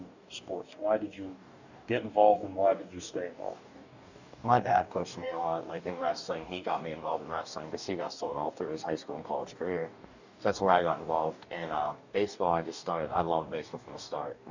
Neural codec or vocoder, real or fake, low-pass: codec, 44.1 kHz, 2.6 kbps, DAC; fake; 7.2 kHz